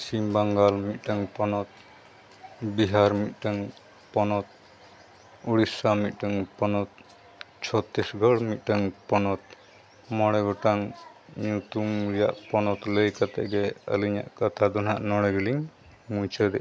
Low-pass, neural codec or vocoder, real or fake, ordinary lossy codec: none; none; real; none